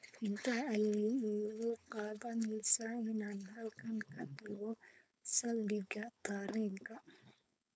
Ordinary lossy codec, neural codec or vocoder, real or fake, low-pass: none; codec, 16 kHz, 4.8 kbps, FACodec; fake; none